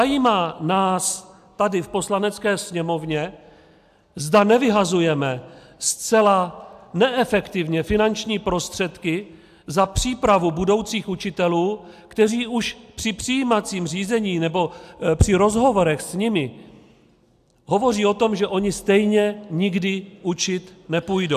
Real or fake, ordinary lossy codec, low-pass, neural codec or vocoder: real; AAC, 96 kbps; 14.4 kHz; none